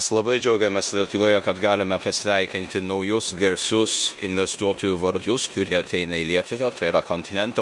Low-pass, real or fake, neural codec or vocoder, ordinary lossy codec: 10.8 kHz; fake; codec, 16 kHz in and 24 kHz out, 0.9 kbps, LongCat-Audio-Codec, four codebook decoder; MP3, 64 kbps